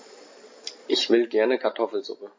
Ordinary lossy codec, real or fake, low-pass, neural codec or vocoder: MP3, 32 kbps; real; 7.2 kHz; none